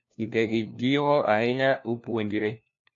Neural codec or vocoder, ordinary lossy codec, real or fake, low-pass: codec, 16 kHz, 1 kbps, FunCodec, trained on LibriTTS, 50 frames a second; AAC, 48 kbps; fake; 7.2 kHz